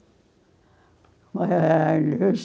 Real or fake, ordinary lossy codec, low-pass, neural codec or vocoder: real; none; none; none